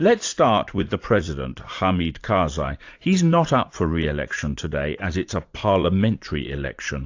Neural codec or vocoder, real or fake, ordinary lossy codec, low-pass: vocoder, 44.1 kHz, 80 mel bands, Vocos; fake; AAC, 48 kbps; 7.2 kHz